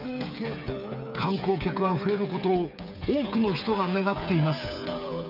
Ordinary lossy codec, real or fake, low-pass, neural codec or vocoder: none; fake; 5.4 kHz; codec, 16 kHz, 8 kbps, FreqCodec, smaller model